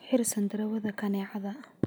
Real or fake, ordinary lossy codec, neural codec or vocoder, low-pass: real; none; none; none